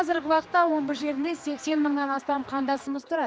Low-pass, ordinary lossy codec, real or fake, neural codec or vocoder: none; none; fake; codec, 16 kHz, 2 kbps, X-Codec, HuBERT features, trained on general audio